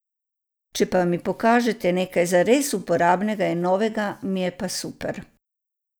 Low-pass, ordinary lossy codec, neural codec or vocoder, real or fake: none; none; none; real